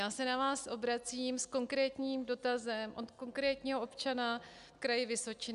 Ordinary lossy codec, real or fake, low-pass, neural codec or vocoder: AAC, 96 kbps; real; 10.8 kHz; none